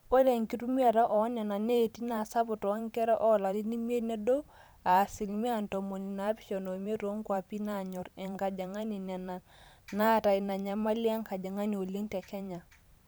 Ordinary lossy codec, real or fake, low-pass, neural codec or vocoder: none; fake; none; vocoder, 44.1 kHz, 128 mel bands every 512 samples, BigVGAN v2